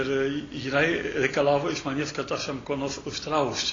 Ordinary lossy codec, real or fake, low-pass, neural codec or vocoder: AAC, 32 kbps; real; 7.2 kHz; none